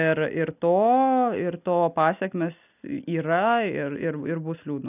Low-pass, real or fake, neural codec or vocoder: 3.6 kHz; real; none